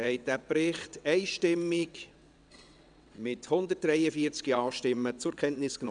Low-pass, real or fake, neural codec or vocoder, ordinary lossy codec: 9.9 kHz; fake; vocoder, 22.05 kHz, 80 mel bands, WaveNeXt; none